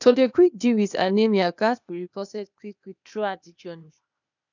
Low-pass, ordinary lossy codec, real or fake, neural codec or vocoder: 7.2 kHz; none; fake; codec, 16 kHz, 0.8 kbps, ZipCodec